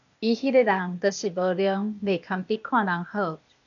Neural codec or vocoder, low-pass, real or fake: codec, 16 kHz, 0.8 kbps, ZipCodec; 7.2 kHz; fake